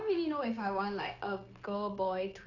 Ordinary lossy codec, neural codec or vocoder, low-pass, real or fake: none; codec, 16 kHz, 0.9 kbps, LongCat-Audio-Codec; 7.2 kHz; fake